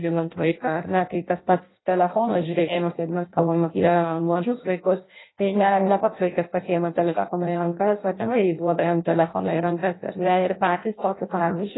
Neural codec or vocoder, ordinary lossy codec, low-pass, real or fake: codec, 16 kHz in and 24 kHz out, 0.6 kbps, FireRedTTS-2 codec; AAC, 16 kbps; 7.2 kHz; fake